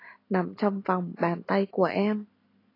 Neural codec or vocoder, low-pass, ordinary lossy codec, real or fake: none; 5.4 kHz; AAC, 24 kbps; real